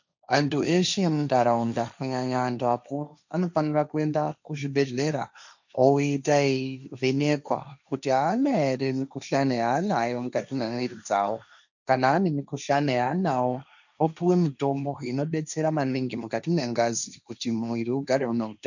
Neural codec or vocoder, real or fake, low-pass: codec, 16 kHz, 1.1 kbps, Voila-Tokenizer; fake; 7.2 kHz